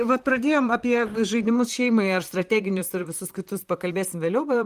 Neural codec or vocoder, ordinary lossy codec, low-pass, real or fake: vocoder, 44.1 kHz, 128 mel bands, Pupu-Vocoder; Opus, 24 kbps; 14.4 kHz; fake